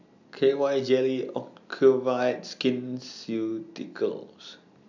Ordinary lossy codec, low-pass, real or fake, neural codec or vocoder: none; 7.2 kHz; real; none